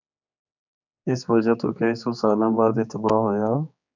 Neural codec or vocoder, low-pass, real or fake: codec, 16 kHz, 4 kbps, X-Codec, HuBERT features, trained on general audio; 7.2 kHz; fake